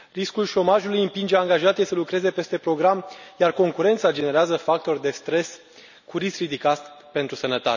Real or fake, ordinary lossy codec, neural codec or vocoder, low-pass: real; none; none; 7.2 kHz